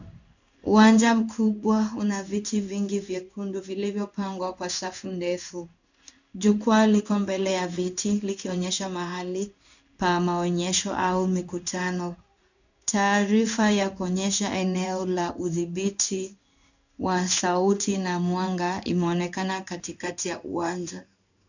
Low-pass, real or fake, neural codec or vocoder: 7.2 kHz; fake; codec, 16 kHz in and 24 kHz out, 1 kbps, XY-Tokenizer